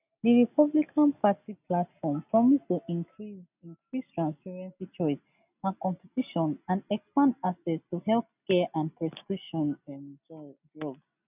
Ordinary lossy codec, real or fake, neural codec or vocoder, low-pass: none; real; none; 3.6 kHz